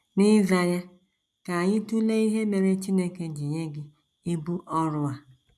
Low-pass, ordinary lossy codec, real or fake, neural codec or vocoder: none; none; real; none